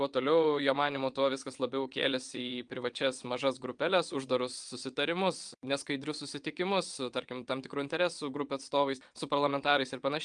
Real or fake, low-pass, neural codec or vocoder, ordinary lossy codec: fake; 9.9 kHz; vocoder, 22.05 kHz, 80 mel bands, WaveNeXt; Opus, 32 kbps